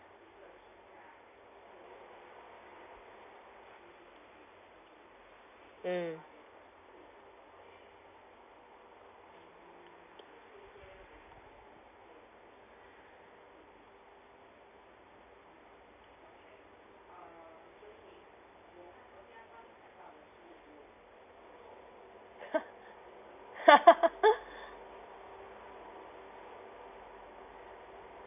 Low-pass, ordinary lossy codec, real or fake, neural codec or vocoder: 3.6 kHz; none; real; none